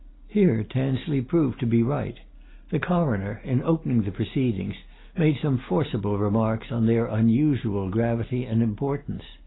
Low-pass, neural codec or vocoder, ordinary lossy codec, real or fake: 7.2 kHz; none; AAC, 16 kbps; real